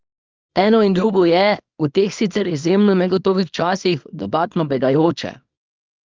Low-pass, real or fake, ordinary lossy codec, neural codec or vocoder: 7.2 kHz; fake; Opus, 32 kbps; codec, 24 kHz, 0.9 kbps, WavTokenizer, small release